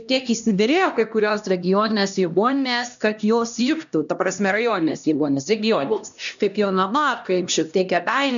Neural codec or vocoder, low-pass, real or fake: codec, 16 kHz, 1 kbps, X-Codec, HuBERT features, trained on LibriSpeech; 7.2 kHz; fake